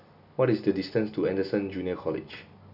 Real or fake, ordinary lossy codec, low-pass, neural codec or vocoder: real; none; 5.4 kHz; none